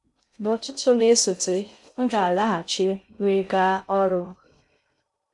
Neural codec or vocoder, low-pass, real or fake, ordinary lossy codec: codec, 16 kHz in and 24 kHz out, 0.6 kbps, FocalCodec, streaming, 2048 codes; 10.8 kHz; fake; MP3, 96 kbps